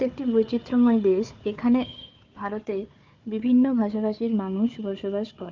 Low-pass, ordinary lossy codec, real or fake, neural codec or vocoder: 7.2 kHz; Opus, 32 kbps; fake; codec, 16 kHz, 4 kbps, FunCodec, trained on Chinese and English, 50 frames a second